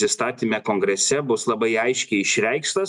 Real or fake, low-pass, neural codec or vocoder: real; 10.8 kHz; none